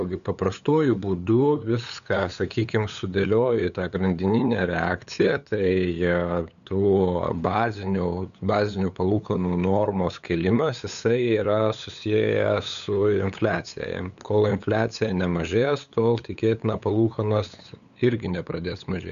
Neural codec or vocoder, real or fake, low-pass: codec, 16 kHz, 8 kbps, FunCodec, trained on LibriTTS, 25 frames a second; fake; 7.2 kHz